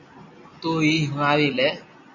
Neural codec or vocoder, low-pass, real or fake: none; 7.2 kHz; real